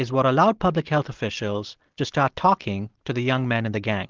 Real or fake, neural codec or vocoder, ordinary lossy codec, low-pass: real; none; Opus, 16 kbps; 7.2 kHz